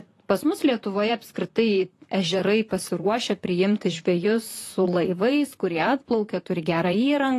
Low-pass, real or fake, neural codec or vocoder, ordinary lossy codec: 14.4 kHz; fake; vocoder, 44.1 kHz, 128 mel bands, Pupu-Vocoder; AAC, 48 kbps